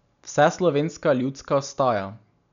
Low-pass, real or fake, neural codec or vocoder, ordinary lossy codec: 7.2 kHz; real; none; none